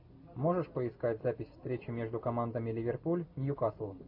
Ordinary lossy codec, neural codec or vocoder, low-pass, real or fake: AAC, 48 kbps; none; 5.4 kHz; real